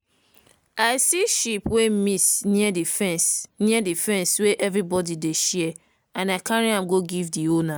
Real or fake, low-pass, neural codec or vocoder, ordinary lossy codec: real; none; none; none